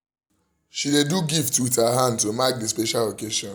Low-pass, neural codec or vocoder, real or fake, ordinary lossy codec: none; none; real; none